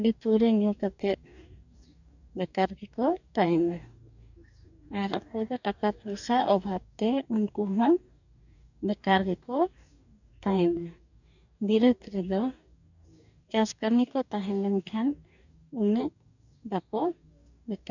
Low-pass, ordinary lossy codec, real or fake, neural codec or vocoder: 7.2 kHz; none; fake; codec, 44.1 kHz, 2.6 kbps, DAC